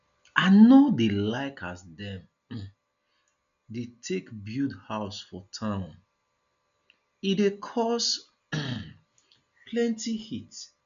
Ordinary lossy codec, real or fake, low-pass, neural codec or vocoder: none; real; 7.2 kHz; none